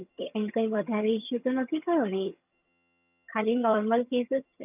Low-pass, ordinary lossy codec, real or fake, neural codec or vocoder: 3.6 kHz; none; fake; vocoder, 22.05 kHz, 80 mel bands, HiFi-GAN